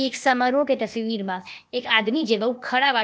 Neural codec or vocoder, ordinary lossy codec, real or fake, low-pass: codec, 16 kHz, 0.8 kbps, ZipCodec; none; fake; none